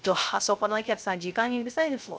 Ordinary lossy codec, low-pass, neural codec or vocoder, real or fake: none; none; codec, 16 kHz, 0.3 kbps, FocalCodec; fake